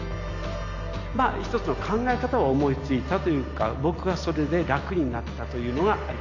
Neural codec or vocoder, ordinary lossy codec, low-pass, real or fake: none; AAC, 48 kbps; 7.2 kHz; real